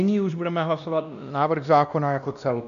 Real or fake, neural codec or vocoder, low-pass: fake; codec, 16 kHz, 1 kbps, X-Codec, WavLM features, trained on Multilingual LibriSpeech; 7.2 kHz